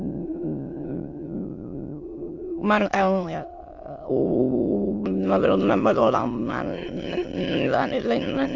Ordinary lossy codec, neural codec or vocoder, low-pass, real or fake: AAC, 48 kbps; autoencoder, 22.05 kHz, a latent of 192 numbers a frame, VITS, trained on many speakers; 7.2 kHz; fake